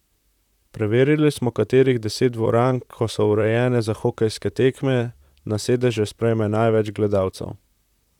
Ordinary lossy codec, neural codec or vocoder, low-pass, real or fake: none; vocoder, 44.1 kHz, 128 mel bands, Pupu-Vocoder; 19.8 kHz; fake